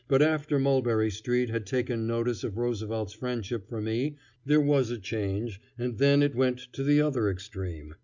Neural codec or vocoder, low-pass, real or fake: none; 7.2 kHz; real